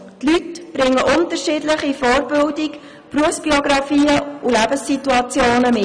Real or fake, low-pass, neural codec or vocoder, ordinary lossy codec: real; 9.9 kHz; none; none